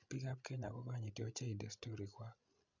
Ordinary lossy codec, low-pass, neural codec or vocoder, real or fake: none; 7.2 kHz; codec, 16 kHz, 16 kbps, FreqCodec, larger model; fake